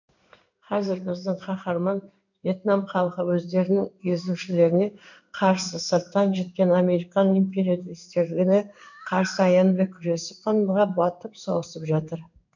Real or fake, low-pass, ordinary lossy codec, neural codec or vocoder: fake; 7.2 kHz; none; codec, 16 kHz in and 24 kHz out, 1 kbps, XY-Tokenizer